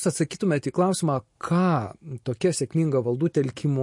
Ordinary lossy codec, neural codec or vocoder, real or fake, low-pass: MP3, 48 kbps; none; real; 10.8 kHz